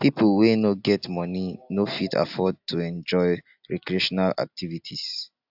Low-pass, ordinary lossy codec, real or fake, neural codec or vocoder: 5.4 kHz; AAC, 48 kbps; real; none